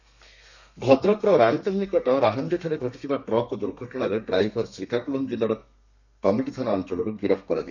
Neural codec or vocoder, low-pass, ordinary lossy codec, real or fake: codec, 32 kHz, 1.9 kbps, SNAC; 7.2 kHz; none; fake